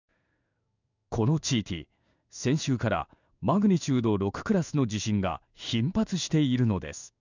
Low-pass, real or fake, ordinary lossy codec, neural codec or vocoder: 7.2 kHz; fake; none; codec, 16 kHz in and 24 kHz out, 1 kbps, XY-Tokenizer